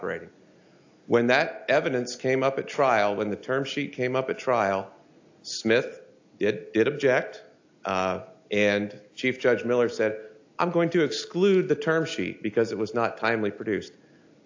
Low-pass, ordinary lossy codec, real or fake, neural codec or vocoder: 7.2 kHz; AAC, 48 kbps; real; none